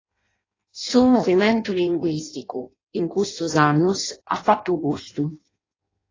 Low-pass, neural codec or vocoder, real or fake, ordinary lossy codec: 7.2 kHz; codec, 16 kHz in and 24 kHz out, 0.6 kbps, FireRedTTS-2 codec; fake; AAC, 32 kbps